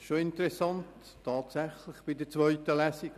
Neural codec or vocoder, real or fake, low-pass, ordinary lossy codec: none; real; 14.4 kHz; none